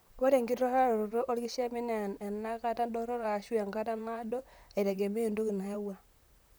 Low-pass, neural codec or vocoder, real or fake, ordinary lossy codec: none; vocoder, 44.1 kHz, 128 mel bands, Pupu-Vocoder; fake; none